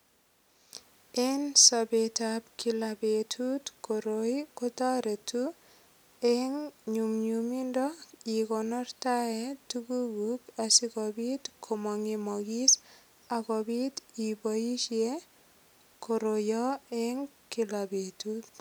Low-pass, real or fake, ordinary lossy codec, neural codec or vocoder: none; real; none; none